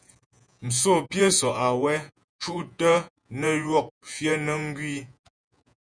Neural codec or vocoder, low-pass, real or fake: vocoder, 48 kHz, 128 mel bands, Vocos; 9.9 kHz; fake